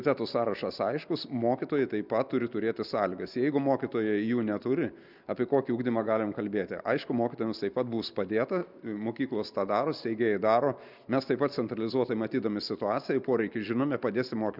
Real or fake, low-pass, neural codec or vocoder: real; 5.4 kHz; none